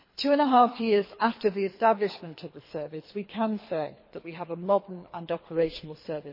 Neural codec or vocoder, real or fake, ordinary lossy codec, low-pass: codec, 24 kHz, 3 kbps, HILCodec; fake; MP3, 24 kbps; 5.4 kHz